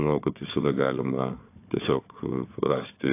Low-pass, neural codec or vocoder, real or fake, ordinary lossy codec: 3.6 kHz; codec, 16 kHz, 4 kbps, FunCodec, trained on Chinese and English, 50 frames a second; fake; AAC, 24 kbps